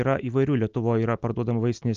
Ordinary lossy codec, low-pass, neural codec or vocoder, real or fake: Opus, 24 kbps; 7.2 kHz; none; real